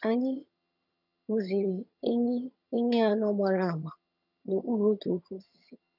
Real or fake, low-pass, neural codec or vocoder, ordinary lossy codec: fake; 5.4 kHz; vocoder, 22.05 kHz, 80 mel bands, HiFi-GAN; none